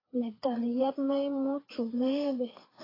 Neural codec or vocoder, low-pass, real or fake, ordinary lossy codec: codec, 16 kHz, 4 kbps, FreqCodec, larger model; 5.4 kHz; fake; AAC, 24 kbps